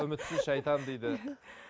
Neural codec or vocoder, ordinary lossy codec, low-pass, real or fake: none; none; none; real